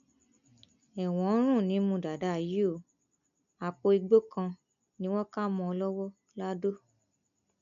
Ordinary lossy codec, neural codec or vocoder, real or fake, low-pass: none; none; real; 7.2 kHz